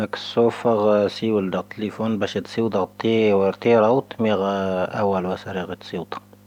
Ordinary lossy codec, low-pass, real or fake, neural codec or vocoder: none; 19.8 kHz; real; none